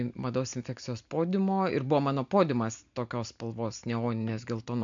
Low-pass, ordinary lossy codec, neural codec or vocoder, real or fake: 7.2 kHz; AAC, 64 kbps; none; real